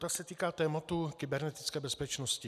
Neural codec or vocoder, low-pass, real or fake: none; 14.4 kHz; real